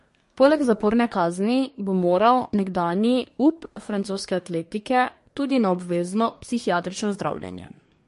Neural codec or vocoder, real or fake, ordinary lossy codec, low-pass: codec, 24 kHz, 1 kbps, SNAC; fake; MP3, 48 kbps; 10.8 kHz